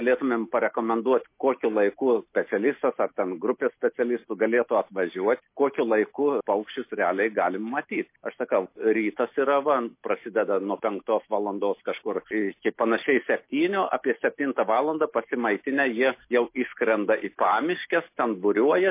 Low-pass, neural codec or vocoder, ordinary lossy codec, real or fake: 3.6 kHz; none; MP3, 24 kbps; real